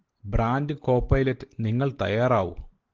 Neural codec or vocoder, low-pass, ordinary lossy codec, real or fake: none; 7.2 kHz; Opus, 16 kbps; real